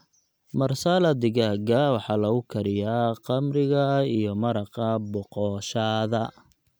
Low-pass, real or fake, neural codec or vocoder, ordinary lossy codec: none; real; none; none